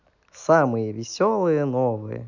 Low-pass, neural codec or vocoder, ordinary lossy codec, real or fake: 7.2 kHz; none; none; real